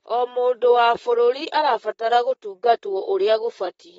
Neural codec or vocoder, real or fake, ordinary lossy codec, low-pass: vocoder, 44.1 kHz, 128 mel bands, Pupu-Vocoder; fake; AAC, 24 kbps; 19.8 kHz